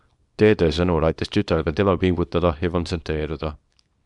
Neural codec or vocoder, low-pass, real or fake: codec, 24 kHz, 0.9 kbps, WavTokenizer, small release; 10.8 kHz; fake